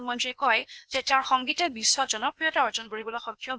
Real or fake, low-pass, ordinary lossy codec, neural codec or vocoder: fake; none; none; codec, 16 kHz, 0.8 kbps, ZipCodec